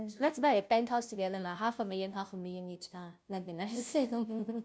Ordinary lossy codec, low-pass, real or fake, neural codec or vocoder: none; none; fake; codec, 16 kHz, 0.5 kbps, FunCodec, trained on Chinese and English, 25 frames a second